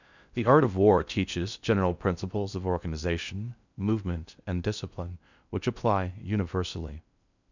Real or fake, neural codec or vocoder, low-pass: fake; codec, 16 kHz in and 24 kHz out, 0.6 kbps, FocalCodec, streaming, 4096 codes; 7.2 kHz